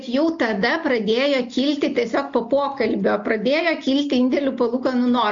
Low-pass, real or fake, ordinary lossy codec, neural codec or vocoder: 7.2 kHz; real; MP3, 48 kbps; none